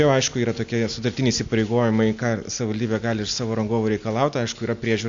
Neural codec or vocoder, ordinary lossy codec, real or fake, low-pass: none; AAC, 64 kbps; real; 7.2 kHz